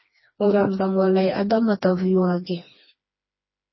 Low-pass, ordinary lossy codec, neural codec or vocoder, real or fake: 7.2 kHz; MP3, 24 kbps; codec, 16 kHz, 2 kbps, FreqCodec, smaller model; fake